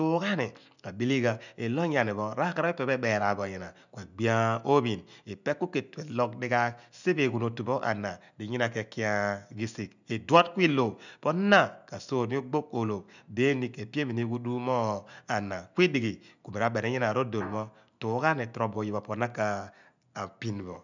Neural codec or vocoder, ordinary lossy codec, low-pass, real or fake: none; none; 7.2 kHz; real